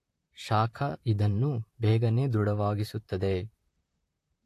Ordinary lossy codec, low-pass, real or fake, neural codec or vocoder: AAC, 64 kbps; 14.4 kHz; fake; vocoder, 44.1 kHz, 128 mel bands, Pupu-Vocoder